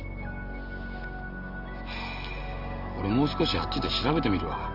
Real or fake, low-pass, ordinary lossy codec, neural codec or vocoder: real; 5.4 kHz; Opus, 24 kbps; none